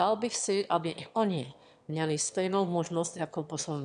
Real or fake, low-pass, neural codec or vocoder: fake; 9.9 kHz; autoencoder, 22.05 kHz, a latent of 192 numbers a frame, VITS, trained on one speaker